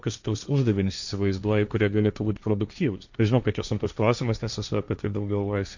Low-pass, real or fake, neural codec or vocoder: 7.2 kHz; fake; codec, 16 kHz, 1.1 kbps, Voila-Tokenizer